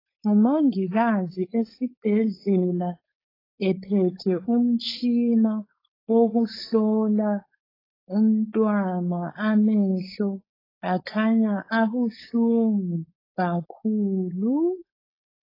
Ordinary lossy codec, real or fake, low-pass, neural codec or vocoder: AAC, 24 kbps; fake; 5.4 kHz; codec, 16 kHz, 4.8 kbps, FACodec